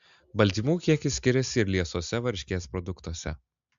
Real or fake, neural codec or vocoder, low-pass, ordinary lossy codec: real; none; 7.2 kHz; MP3, 64 kbps